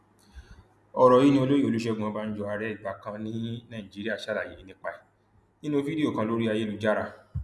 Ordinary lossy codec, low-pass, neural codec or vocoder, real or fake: none; none; none; real